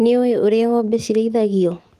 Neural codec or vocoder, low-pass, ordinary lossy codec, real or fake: autoencoder, 48 kHz, 128 numbers a frame, DAC-VAE, trained on Japanese speech; 14.4 kHz; Opus, 24 kbps; fake